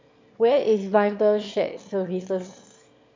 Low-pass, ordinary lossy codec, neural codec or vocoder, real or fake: 7.2 kHz; MP3, 48 kbps; autoencoder, 22.05 kHz, a latent of 192 numbers a frame, VITS, trained on one speaker; fake